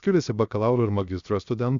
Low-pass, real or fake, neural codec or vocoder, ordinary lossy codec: 7.2 kHz; fake; codec, 16 kHz, 0.7 kbps, FocalCodec; Opus, 64 kbps